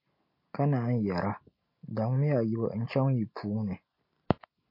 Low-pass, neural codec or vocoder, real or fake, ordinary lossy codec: 5.4 kHz; none; real; MP3, 32 kbps